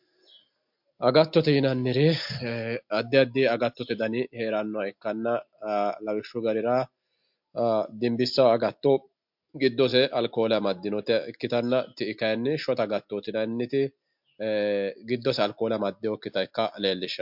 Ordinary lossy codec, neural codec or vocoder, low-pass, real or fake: MP3, 48 kbps; none; 5.4 kHz; real